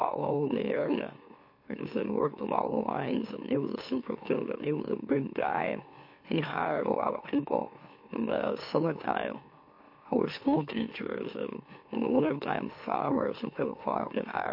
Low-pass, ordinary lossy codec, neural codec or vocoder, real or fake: 5.4 kHz; MP3, 32 kbps; autoencoder, 44.1 kHz, a latent of 192 numbers a frame, MeloTTS; fake